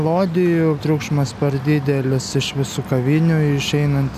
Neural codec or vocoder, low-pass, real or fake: none; 14.4 kHz; real